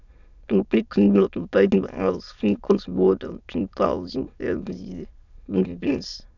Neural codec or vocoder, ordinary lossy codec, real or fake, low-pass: autoencoder, 22.05 kHz, a latent of 192 numbers a frame, VITS, trained on many speakers; none; fake; 7.2 kHz